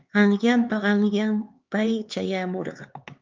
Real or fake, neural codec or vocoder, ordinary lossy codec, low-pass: fake; codec, 16 kHz, 4 kbps, X-Codec, HuBERT features, trained on LibriSpeech; Opus, 24 kbps; 7.2 kHz